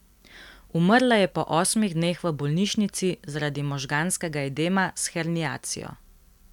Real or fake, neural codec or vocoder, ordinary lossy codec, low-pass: real; none; none; 19.8 kHz